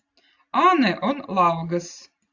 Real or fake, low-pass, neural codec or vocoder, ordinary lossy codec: real; 7.2 kHz; none; AAC, 48 kbps